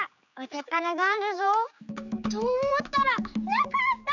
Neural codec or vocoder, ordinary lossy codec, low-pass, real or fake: codec, 16 kHz, 4 kbps, X-Codec, HuBERT features, trained on general audio; none; 7.2 kHz; fake